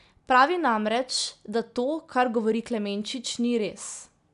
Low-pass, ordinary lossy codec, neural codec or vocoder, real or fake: 10.8 kHz; none; none; real